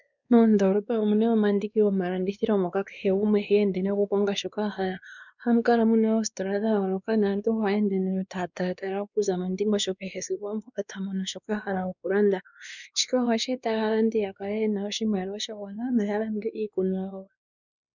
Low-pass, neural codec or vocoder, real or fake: 7.2 kHz; codec, 16 kHz, 2 kbps, X-Codec, WavLM features, trained on Multilingual LibriSpeech; fake